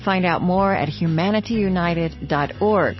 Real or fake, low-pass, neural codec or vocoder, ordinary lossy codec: real; 7.2 kHz; none; MP3, 24 kbps